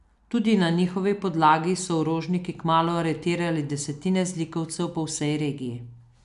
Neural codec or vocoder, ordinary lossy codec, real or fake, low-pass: none; none; real; 10.8 kHz